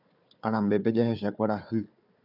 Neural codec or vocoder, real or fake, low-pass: codec, 16 kHz, 4 kbps, FunCodec, trained on Chinese and English, 50 frames a second; fake; 5.4 kHz